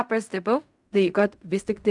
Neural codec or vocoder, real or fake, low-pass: codec, 16 kHz in and 24 kHz out, 0.4 kbps, LongCat-Audio-Codec, fine tuned four codebook decoder; fake; 10.8 kHz